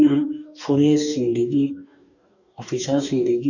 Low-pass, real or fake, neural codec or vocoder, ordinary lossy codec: 7.2 kHz; fake; codec, 44.1 kHz, 2.6 kbps, DAC; none